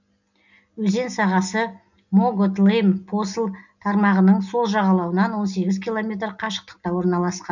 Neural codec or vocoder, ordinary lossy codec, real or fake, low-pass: none; none; real; 7.2 kHz